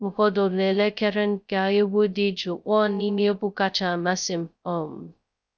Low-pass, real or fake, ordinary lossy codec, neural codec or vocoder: none; fake; none; codec, 16 kHz, 0.2 kbps, FocalCodec